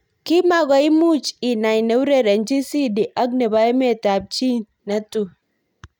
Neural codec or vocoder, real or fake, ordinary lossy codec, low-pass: none; real; none; 19.8 kHz